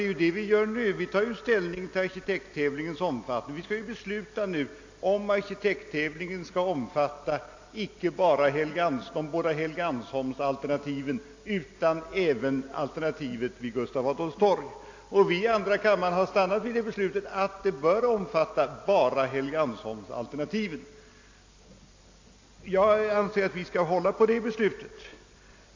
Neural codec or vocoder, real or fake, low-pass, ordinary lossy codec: none; real; 7.2 kHz; AAC, 48 kbps